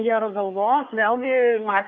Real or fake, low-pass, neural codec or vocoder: fake; 7.2 kHz; codec, 24 kHz, 1 kbps, SNAC